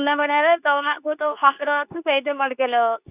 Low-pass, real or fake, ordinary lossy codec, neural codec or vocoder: 3.6 kHz; fake; none; codec, 24 kHz, 0.9 kbps, WavTokenizer, medium speech release version 2